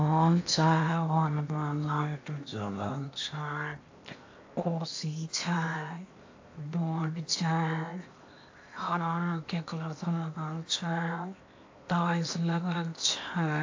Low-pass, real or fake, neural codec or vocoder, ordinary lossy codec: 7.2 kHz; fake; codec, 16 kHz in and 24 kHz out, 0.8 kbps, FocalCodec, streaming, 65536 codes; AAC, 48 kbps